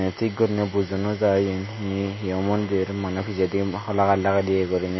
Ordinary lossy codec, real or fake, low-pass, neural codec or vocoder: MP3, 24 kbps; real; 7.2 kHz; none